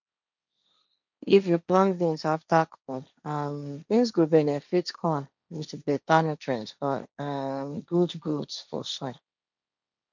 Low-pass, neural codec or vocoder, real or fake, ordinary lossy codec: 7.2 kHz; codec, 16 kHz, 1.1 kbps, Voila-Tokenizer; fake; none